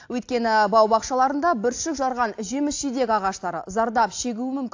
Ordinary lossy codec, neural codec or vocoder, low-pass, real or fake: AAC, 48 kbps; none; 7.2 kHz; real